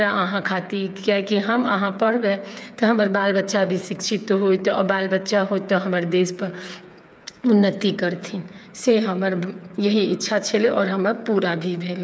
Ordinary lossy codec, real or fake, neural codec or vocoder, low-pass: none; fake; codec, 16 kHz, 8 kbps, FreqCodec, smaller model; none